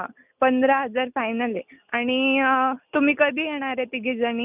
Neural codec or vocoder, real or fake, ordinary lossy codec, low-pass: none; real; none; 3.6 kHz